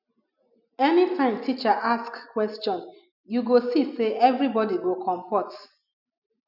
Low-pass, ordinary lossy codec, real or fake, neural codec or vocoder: 5.4 kHz; none; real; none